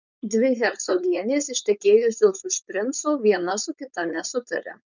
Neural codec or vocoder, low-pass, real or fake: codec, 16 kHz, 4.8 kbps, FACodec; 7.2 kHz; fake